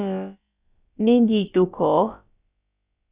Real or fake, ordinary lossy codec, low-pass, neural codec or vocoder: fake; Opus, 64 kbps; 3.6 kHz; codec, 16 kHz, about 1 kbps, DyCAST, with the encoder's durations